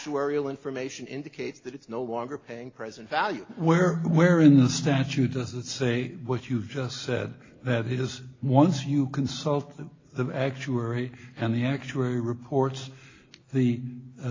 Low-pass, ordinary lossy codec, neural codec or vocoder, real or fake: 7.2 kHz; AAC, 32 kbps; none; real